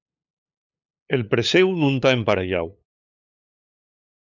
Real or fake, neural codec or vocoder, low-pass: fake; codec, 16 kHz, 8 kbps, FunCodec, trained on LibriTTS, 25 frames a second; 7.2 kHz